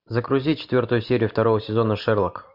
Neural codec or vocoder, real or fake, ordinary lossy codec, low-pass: none; real; MP3, 48 kbps; 5.4 kHz